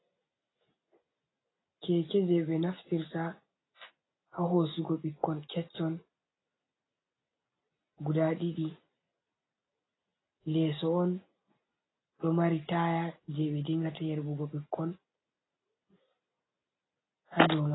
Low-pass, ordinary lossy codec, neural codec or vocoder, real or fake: 7.2 kHz; AAC, 16 kbps; none; real